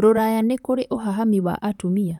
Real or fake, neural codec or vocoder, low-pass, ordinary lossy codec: fake; vocoder, 44.1 kHz, 128 mel bands, Pupu-Vocoder; 19.8 kHz; none